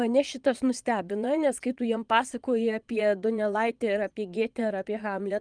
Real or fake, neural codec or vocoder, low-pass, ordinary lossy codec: fake; vocoder, 22.05 kHz, 80 mel bands, Vocos; 9.9 kHz; Opus, 32 kbps